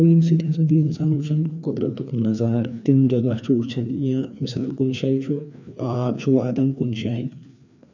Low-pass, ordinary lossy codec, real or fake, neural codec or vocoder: 7.2 kHz; none; fake; codec, 16 kHz, 2 kbps, FreqCodec, larger model